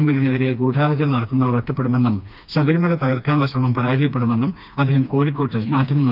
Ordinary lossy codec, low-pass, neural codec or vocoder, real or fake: none; 5.4 kHz; codec, 16 kHz, 2 kbps, FreqCodec, smaller model; fake